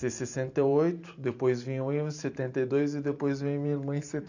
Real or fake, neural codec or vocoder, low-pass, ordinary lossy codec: fake; vocoder, 44.1 kHz, 128 mel bands every 512 samples, BigVGAN v2; 7.2 kHz; none